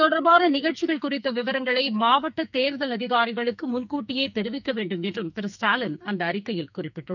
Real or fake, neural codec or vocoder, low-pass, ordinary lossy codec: fake; codec, 44.1 kHz, 2.6 kbps, SNAC; 7.2 kHz; none